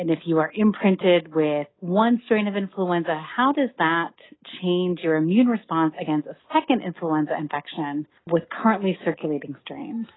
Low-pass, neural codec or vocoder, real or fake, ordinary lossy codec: 7.2 kHz; none; real; AAC, 16 kbps